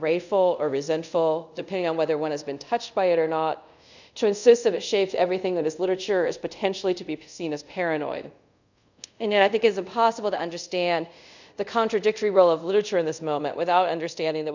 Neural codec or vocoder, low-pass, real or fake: codec, 24 kHz, 0.5 kbps, DualCodec; 7.2 kHz; fake